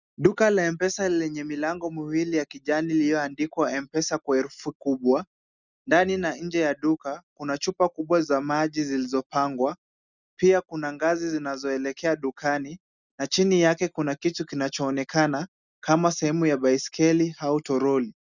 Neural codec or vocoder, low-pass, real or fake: none; 7.2 kHz; real